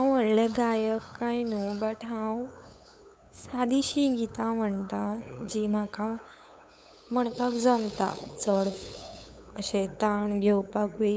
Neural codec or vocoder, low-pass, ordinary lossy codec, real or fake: codec, 16 kHz, 8 kbps, FunCodec, trained on LibriTTS, 25 frames a second; none; none; fake